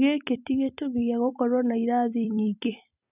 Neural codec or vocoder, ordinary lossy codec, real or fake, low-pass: vocoder, 44.1 kHz, 128 mel bands every 256 samples, BigVGAN v2; none; fake; 3.6 kHz